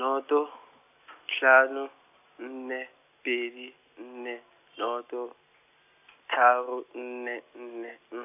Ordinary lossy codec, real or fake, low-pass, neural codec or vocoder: none; real; 3.6 kHz; none